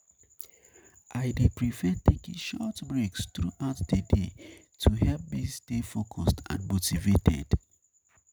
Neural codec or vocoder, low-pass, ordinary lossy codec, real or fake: none; none; none; real